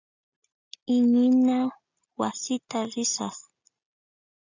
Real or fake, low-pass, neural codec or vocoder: real; 7.2 kHz; none